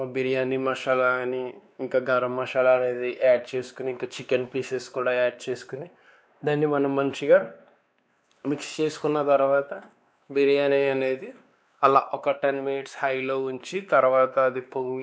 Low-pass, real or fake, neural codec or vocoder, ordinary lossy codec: none; fake; codec, 16 kHz, 2 kbps, X-Codec, WavLM features, trained on Multilingual LibriSpeech; none